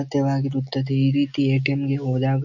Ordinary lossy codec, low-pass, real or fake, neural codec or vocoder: none; 7.2 kHz; real; none